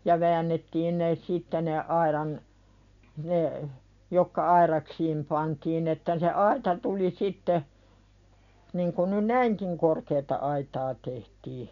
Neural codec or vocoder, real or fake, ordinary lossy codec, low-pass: none; real; none; 7.2 kHz